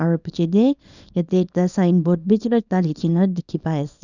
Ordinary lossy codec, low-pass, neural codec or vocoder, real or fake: none; 7.2 kHz; codec, 24 kHz, 0.9 kbps, WavTokenizer, small release; fake